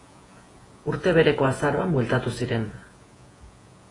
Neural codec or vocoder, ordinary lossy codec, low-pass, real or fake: vocoder, 48 kHz, 128 mel bands, Vocos; AAC, 32 kbps; 10.8 kHz; fake